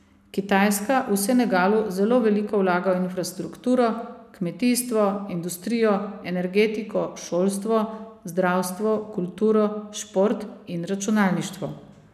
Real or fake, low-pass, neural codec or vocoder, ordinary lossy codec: real; 14.4 kHz; none; none